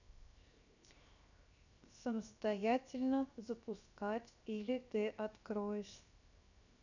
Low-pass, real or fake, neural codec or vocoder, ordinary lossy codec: 7.2 kHz; fake; codec, 16 kHz, 0.7 kbps, FocalCodec; none